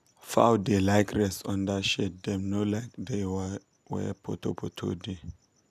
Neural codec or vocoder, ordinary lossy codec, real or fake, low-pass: none; none; real; 14.4 kHz